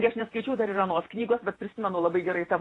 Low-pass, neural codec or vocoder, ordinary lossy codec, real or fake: 10.8 kHz; vocoder, 48 kHz, 128 mel bands, Vocos; AAC, 32 kbps; fake